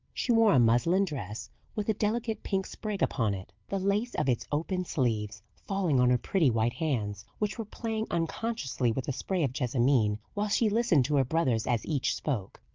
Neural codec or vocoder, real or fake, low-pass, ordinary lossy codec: none; real; 7.2 kHz; Opus, 32 kbps